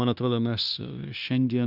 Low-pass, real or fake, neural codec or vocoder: 5.4 kHz; fake; codec, 16 kHz, 0.9 kbps, LongCat-Audio-Codec